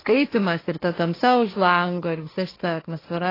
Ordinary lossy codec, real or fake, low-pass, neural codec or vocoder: AAC, 24 kbps; fake; 5.4 kHz; codec, 16 kHz, 1.1 kbps, Voila-Tokenizer